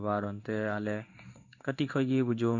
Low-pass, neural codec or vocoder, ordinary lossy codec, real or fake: 7.2 kHz; codec, 16 kHz in and 24 kHz out, 1 kbps, XY-Tokenizer; none; fake